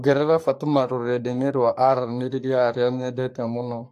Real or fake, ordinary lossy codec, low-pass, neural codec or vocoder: fake; AAC, 64 kbps; 14.4 kHz; codec, 44.1 kHz, 3.4 kbps, Pupu-Codec